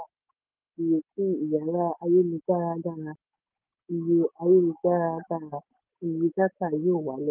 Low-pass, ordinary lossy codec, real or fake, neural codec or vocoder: 3.6 kHz; Opus, 24 kbps; real; none